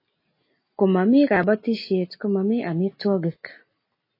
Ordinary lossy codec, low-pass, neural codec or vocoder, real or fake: MP3, 24 kbps; 5.4 kHz; none; real